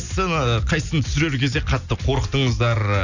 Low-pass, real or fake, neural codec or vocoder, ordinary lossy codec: 7.2 kHz; real; none; none